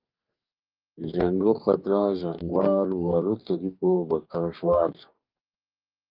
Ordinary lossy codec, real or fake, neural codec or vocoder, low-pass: Opus, 24 kbps; fake; codec, 44.1 kHz, 2.6 kbps, DAC; 5.4 kHz